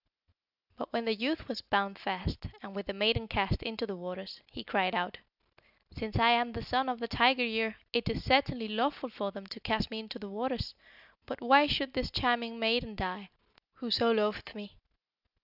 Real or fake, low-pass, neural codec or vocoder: real; 5.4 kHz; none